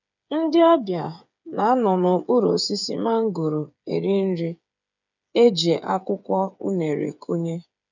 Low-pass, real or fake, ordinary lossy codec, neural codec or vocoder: 7.2 kHz; fake; none; codec, 16 kHz, 8 kbps, FreqCodec, smaller model